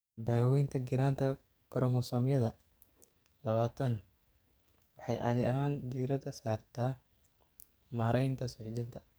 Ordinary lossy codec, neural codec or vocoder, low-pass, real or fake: none; codec, 44.1 kHz, 2.6 kbps, SNAC; none; fake